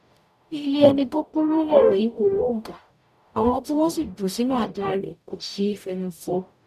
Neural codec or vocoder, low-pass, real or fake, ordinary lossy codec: codec, 44.1 kHz, 0.9 kbps, DAC; 14.4 kHz; fake; none